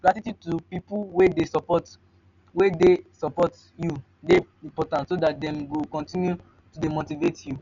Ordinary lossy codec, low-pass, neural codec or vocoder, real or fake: none; 7.2 kHz; none; real